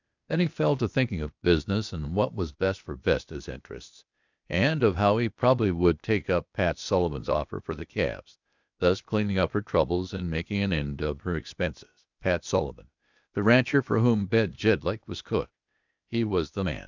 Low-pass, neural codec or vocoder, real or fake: 7.2 kHz; codec, 16 kHz, 0.8 kbps, ZipCodec; fake